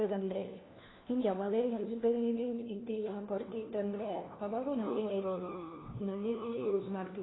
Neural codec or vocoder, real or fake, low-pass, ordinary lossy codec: codec, 16 kHz, 1 kbps, FunCodec, trained on LibriTTS, 50 frames a second; fake; 7.2 kHz; AAC, 16 kbps